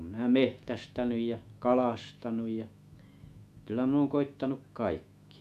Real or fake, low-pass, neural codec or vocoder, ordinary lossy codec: fake; 14.4 kHz; autoencoder, 48 kHz, 128 numbers a frame, DAC-VAE, trained on Japanese speech; AAC, 96 kbps